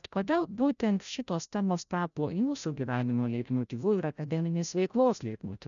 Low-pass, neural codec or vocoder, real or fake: 7.2 kHz; codec, 16 kHz, 0.5 kbps, FreqCodec, larger model; fake